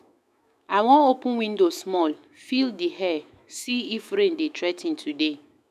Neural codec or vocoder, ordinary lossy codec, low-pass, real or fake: autoencoder, 48 kHz, 128 numbers a frame, DAC-VAE, trained on Japanese speech; none; 14.4 kHz; fake